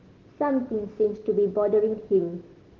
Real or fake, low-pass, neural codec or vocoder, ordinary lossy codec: real; 7.2 kHz; none; Opus, 16 kbps